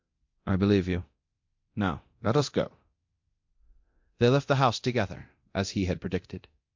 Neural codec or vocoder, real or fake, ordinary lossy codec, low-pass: codec, 24 kHz, 0.9 kbps, DualCodec; fake; MP3, 48 kbps; 7.2 kHz